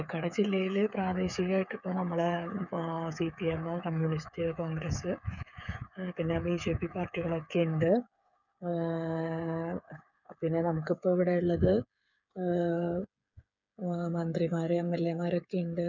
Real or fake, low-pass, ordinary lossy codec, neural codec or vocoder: fake; 7.2 kHz; none; codec, 16 kHz, 8 kbps, FreqCodec, smaller model